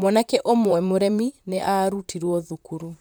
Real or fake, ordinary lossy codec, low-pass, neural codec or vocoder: fake; none; none; vocoder, 44.1 kHz, 128 mel bands, Pupu-Vocoder